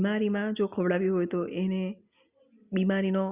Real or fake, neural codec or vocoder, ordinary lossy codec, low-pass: real; none; Opus, 64 kbps; 3.6 kHz